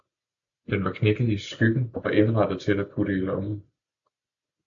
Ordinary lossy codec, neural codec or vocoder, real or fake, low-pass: AAC, 64 kbps; none; real; 7.2 kHz